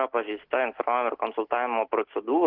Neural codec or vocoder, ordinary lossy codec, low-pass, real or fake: none; Opus, 16 kbps; 5.4 kHz; real